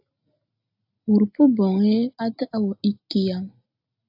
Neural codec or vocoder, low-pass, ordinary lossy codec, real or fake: none; 5.4 kHz; AAC, 48 kbps; real